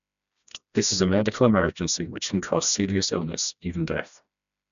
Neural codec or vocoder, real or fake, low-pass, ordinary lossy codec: codec, 16 kHz, 1 kbps, FreqCodec, smaller model; fake; 7.2 kHz; none